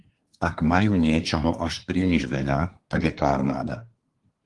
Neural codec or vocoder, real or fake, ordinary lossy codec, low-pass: codec, 24 kHz, 1 kbps, SNAC; fake; Opus, 24 kbps; 10.8 kHz